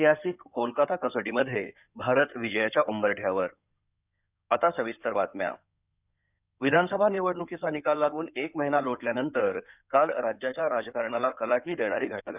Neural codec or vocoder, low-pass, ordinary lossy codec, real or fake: codec, 16 kHz in and 24 kHz out, 2.2 kbps, FireRedTTS-2 codec; 3.6 kHz; none; fake